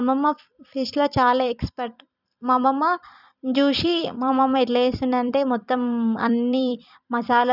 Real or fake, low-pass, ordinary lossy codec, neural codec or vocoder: fake; 5.4 kHz; none; vocoder, 44.1 kHz, 128 mel bands every 256 samples, BigVGAN v2